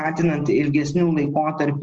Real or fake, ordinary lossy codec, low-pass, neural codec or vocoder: real; Opus, 24 kbps; 7.2 kHz; none